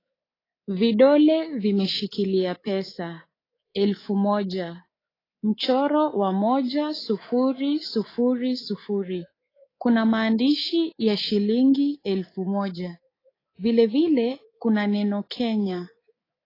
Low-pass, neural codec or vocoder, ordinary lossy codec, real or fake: 5.4 kHz; codec, 24 kHz, 3.1 kbps, DualCodec; AAC, 24 kbps; fake